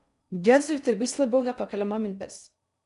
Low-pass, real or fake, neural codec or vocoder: 10.8 kHz; fake; codec, 16 kHz in and 24 kHz out, 0.6 kbps, FocalCodec, streaming, 4096 codes